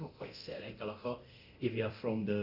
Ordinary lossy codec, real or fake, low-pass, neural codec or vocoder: none; fake; 5.4 kHz; codec, 24 kHz, 0.9 kbps, DualCodec